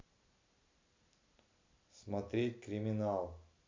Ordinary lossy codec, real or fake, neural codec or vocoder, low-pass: MP3, 64 kbps; real; none; 7.2 kHz